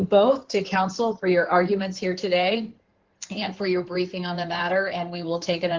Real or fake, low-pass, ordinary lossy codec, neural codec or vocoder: fake; 7.2 kHz; Opus, 16 kbps; codec, 16 kHz in and 24 kHz out, 2.2 kbps, FireRedTTS-2 codec